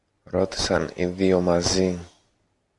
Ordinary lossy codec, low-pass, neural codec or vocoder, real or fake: MP3, 96 kbps; 10.8 kHz; none; real